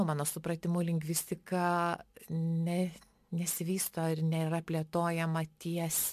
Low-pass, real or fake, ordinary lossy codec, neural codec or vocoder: 14.4 kHz; real; MP3, 96 kbps; none